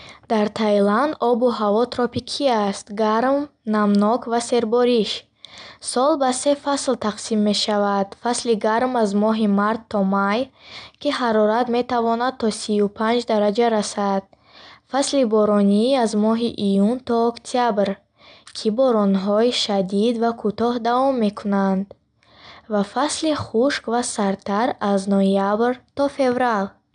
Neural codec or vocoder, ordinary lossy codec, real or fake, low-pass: none; none; real; 9.9 kHz